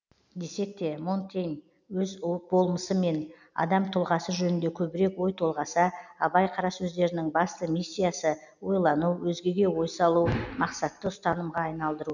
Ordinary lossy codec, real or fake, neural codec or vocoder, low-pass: none; real; none; 7.2 kHz